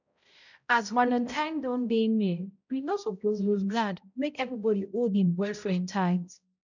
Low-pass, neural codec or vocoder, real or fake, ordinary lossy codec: 7.2 kHz; codec, 16 kHz, 0.5 kbps, X-Codec, HuBERT features, trained on balanced general audio; fake; none